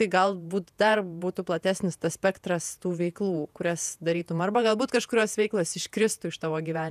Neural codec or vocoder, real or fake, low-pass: vocoder, 48 kHz, 128 mel bands, Vocos; fake; 14.4 kHz